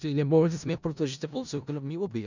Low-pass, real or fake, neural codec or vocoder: 7.2 kHz; fake; codec, 16 kHz in and 24 kHz out, 0.4 kbps, LongCat-Audio-Codec, four codebook decoder